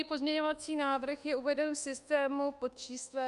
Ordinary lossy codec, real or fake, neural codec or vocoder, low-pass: Opus, 64 kbps; fake; codec, 24 kHz, 1.2 kbps, DualCodec; 10.8 kHz